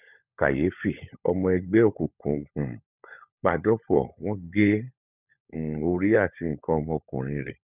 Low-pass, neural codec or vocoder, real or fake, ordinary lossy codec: 3.6 kHz; codec, 16 kHz, 16 kbps, FunCodec, trained on LibriTTS, 50 frames a second; fake; none